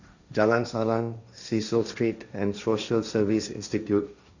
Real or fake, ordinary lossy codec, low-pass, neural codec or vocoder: fake; none; 7.2 kHz; codec, 16 kHz, 1.1 kbps, Voila-Tokenizer